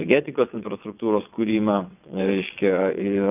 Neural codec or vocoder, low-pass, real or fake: vocoder, 22.05 kHz, 80 mel bands, WaveNeXt; 3.6 kHz; fake